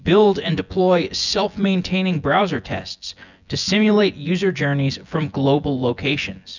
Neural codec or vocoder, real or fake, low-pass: vocoder, 24 kHz, 100 mel bands, Vocos; fake; 7.2 kHz